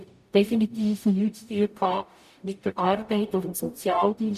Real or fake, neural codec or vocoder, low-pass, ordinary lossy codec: fake; codec, 44.1 kHz, 0.9 kbps, DAC; 14.4 kHz; none